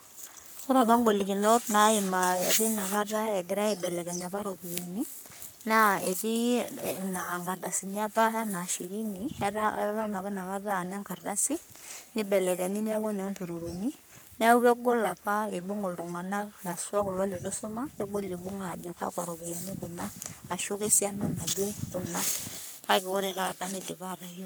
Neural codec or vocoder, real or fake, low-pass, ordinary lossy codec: codec, 44.1 kHz, 3.4 kbps, Pupu-Codec; fake; none; none